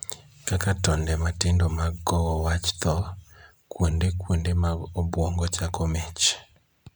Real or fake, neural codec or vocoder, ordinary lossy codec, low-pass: real; none; none; none